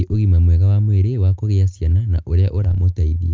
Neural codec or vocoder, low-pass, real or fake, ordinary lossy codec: none; 7.2 kHz; real; Opus, 24 kbps